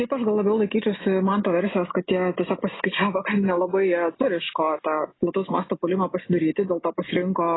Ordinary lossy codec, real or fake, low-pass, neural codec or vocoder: AAC, 16 kbps; real; 7.2 kHz; none